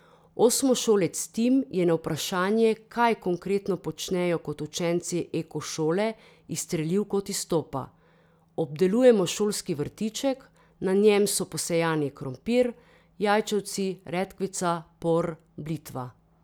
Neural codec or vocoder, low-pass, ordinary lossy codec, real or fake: none; none; none; real